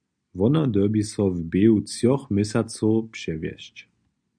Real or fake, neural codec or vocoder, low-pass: real; none; 9.9 kHz